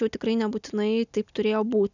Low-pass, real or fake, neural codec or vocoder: 7.2 kHz; real; none